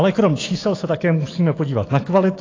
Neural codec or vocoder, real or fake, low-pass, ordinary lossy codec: autoencoder, 48 kHz, 128 numbers a frame, DAC-VAE, trained on Japanese speech; fake; 7.2 kHz; AAC, 32 kbps